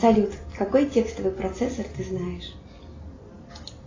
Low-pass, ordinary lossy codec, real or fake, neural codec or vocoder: 7.2 kHz; AAC, 32 kbps; real; none